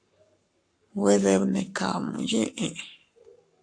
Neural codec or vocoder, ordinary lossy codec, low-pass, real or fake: codec, 44.1 kHz, 7.8 kbps, Pupu-Codec; AAC, 64 kbps; 9.9 kHz; fake